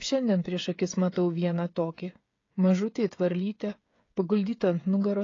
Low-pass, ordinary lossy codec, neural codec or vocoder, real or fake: 7.2 kHz; AAC, 32 kbps; codec, 16 kHz, 16 kbps, FreqCodec, smaller model; fake